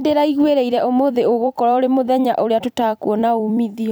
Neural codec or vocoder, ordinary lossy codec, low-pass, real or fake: vocoder, 44.1 kHz, 128 mel bands every 256 samples, BigVGAN v2; none; none; fake